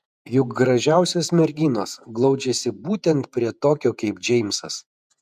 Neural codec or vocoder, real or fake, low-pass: vocoder, 48 kHz, 128 mel bands, Vocos; fake; 14.4 kHz